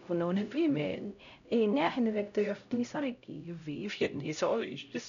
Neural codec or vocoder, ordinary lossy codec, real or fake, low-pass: codec, 16 kHz, 0.5 kbps, X-Codec, HuBERT features, trained on LibriSpeech; none; fake; 7.2 kHz